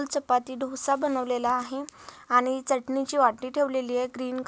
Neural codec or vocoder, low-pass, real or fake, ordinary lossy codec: none; none; real; none